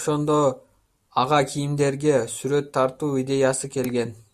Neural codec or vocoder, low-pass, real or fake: none; 14.4 kHz; real